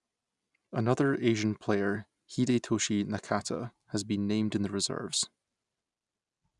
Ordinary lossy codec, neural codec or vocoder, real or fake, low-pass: none; none; real; 10.8 kHz